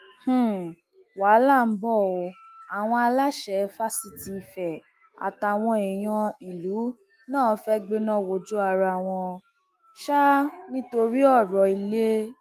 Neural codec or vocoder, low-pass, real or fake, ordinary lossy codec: autoencoder, 48 kHz, 128 numbers a frame, DAC-VAE, trained on Japanese speech; 14.4 kHz; fake; Opus, 32 kbps